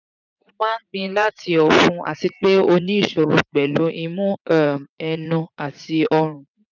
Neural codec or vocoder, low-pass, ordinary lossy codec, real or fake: vocoder, 44.1 kHz, 80 mel bands, Vocos; 7.2 kHz; none; fake